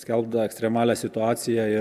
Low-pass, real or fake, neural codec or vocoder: 14.4 kHz; real; none